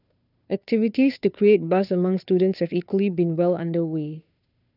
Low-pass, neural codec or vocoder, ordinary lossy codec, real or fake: 5.4 kHz; codec, 16 kHz, 2 kbps, FunCodec, trained on Chinese and English, 25 frames a second; none; fake